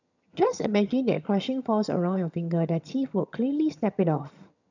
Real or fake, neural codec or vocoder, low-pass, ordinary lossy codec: fake; vocoder, 22.05 kHz, 80 mel bands, HiFi-GAN; 7.2 kHz; none